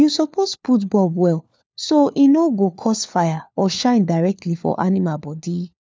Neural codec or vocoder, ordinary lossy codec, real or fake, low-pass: codec, 16 kHz, 4 kbps, FunCodec, trained on LibriTTS, 50 frames a second; none; fake; none